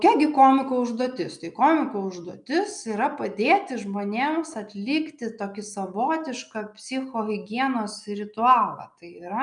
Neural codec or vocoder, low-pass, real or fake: none; 9.9 kHz; real